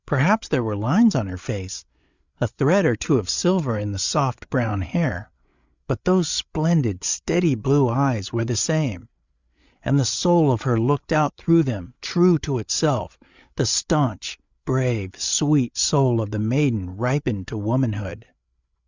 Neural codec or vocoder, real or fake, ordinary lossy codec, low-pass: codec, 16 kHz, 8 kbps, FreqCodec, larger model; fake; Opus, 64 kbps; 7.2 kHz